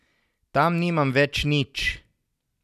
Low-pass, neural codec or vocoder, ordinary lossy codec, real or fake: 14.4 kHz; none; none; real